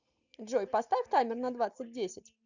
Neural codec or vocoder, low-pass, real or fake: none; 7.2 kHz; real